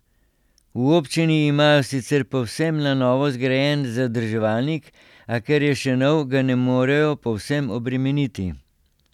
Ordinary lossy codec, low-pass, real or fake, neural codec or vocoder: none; 19.8 kHz; real; none